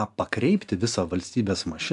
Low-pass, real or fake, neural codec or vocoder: 10.8 kHz; real; none